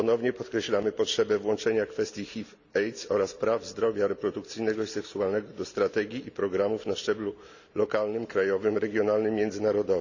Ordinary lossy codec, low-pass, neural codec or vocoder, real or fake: none; 7.2 kHz; none; real